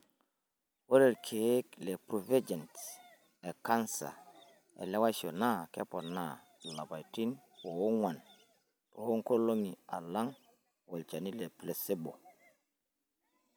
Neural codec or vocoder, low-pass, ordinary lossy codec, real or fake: none; none; none; real